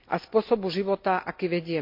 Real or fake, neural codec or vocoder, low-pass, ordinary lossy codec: real; none; 5.4 kHz; MP3, 48 kbps